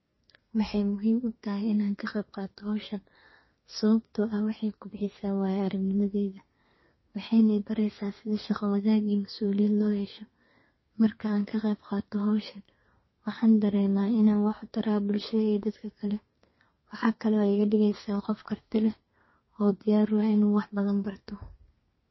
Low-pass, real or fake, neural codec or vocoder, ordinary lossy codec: 7.2 kHz; fake; codec, 32 kHz, 1.9 kbps, SNAC; MP3, 24 kbps